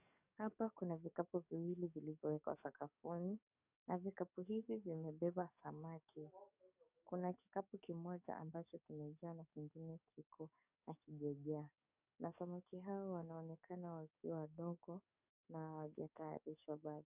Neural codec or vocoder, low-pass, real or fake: codec, 44.1 kHz, 7.8 kbps, DAC; 3.6 kHz; fake